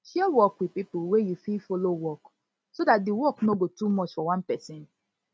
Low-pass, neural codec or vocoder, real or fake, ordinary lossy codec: none; none; real; none